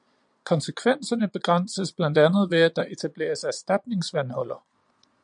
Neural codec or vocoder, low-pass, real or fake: vocoder, 22.05 kHz, 80 mel bands, Vocos; 9.9 kHz; fake